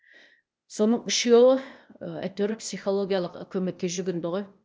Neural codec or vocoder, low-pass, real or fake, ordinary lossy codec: codec, 16 kHz, 0.8 kbps, ZipCodec; none; fake; none